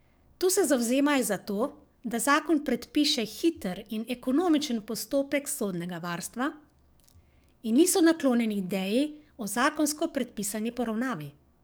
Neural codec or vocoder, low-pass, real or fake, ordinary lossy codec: codec, 44.1 kHz, 7.8 kbps, DAC; none; fake; none